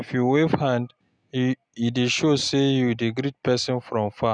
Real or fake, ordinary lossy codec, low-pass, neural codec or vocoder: real; none; 9.9 kHz; none